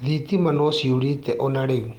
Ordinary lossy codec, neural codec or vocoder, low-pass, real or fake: Opus, 24 kbps; none; 19.8 kHz; real